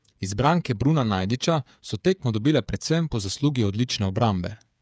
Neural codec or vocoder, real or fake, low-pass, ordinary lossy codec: codec, 16 kHz, 8 kbps, FreqCodec, smaller model; fake; none; none